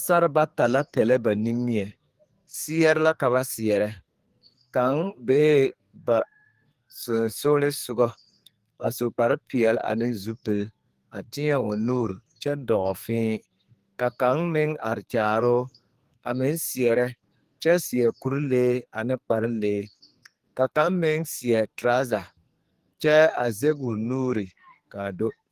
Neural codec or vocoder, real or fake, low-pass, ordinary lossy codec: codec, 44.1 kHz, 2.6 kbps, SNAC; fake; 14.4 kHz; Opus, 24 kbps